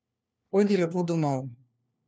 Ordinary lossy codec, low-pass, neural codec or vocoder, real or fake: none; none; codec, 16 kHz, 1 kbps, FunCodec, trained on LibriTTS, 50 frames a second; fake